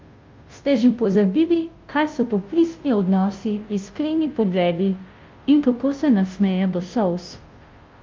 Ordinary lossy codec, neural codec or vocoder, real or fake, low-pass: Opus, 32 kbps; codec, 16 kHz, 0.5 kbps, FunCodec, trained on Chinese and English, 25 frames a second; fake; 7.2 kHz